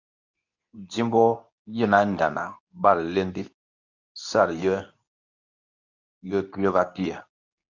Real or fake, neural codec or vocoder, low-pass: fake; codec, 24 kHz, 0.9 kbps, WavTokenizer, medium speech release version 2; 7.2 kHz